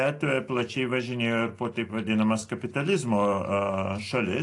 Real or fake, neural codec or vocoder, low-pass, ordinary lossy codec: real; none; 10.8 kHz; AAC, 48 kbps